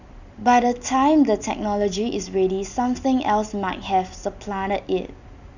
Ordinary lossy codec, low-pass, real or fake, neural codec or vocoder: none; 7.2 kHz; real; none